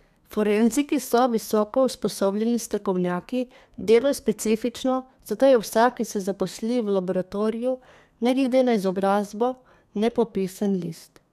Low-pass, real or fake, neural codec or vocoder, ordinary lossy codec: 14.4 kHz; fake; codec, 32 kHz, 1.9 kbps, SNAC; none